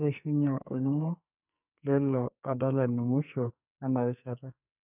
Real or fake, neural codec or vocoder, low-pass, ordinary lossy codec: fake; codec, 44.1 kHz, 2.6 kbps, SNAC; 3.6 kHz; none